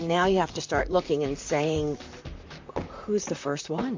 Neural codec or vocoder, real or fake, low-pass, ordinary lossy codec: vocoder, 44.1 kHz, 128 mel bands, Pupu-Vocoder; fake; 7.2 kHz; MP3, 48 kbps